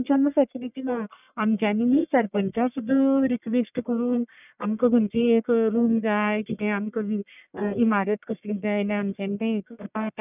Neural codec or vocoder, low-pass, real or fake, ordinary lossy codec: codec, 44.1 kHz, 1.7 kbps, Pupu-Codec; 3.6 kHz; fake; none